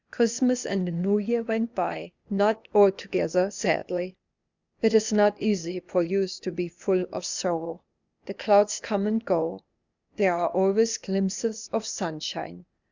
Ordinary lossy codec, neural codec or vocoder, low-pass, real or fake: Opus, 64 kbps; codec, 16 kHz, 0.8 kbps, ZipCodec; 7.2 kHz; fake